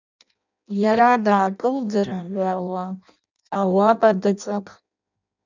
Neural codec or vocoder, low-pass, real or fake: codec, 16 kHz in and 24 kHz out, 0.6 kbps, FireRedTTS-2 codec; 7.2 kHz; fake